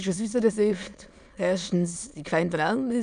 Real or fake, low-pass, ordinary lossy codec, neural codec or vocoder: fake; 9.9 kHz; none; autoencoder, 22.05 kHz, a latent of 192 numbers a frame, VITS, trained on many speakers